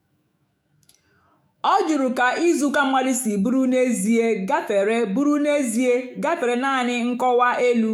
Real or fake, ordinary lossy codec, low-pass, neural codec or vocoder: fake; none; 19.8 kHz; autoencoder, 48 kHz, 128 numbers a frame, DAC-VAE, trained on Japanese speech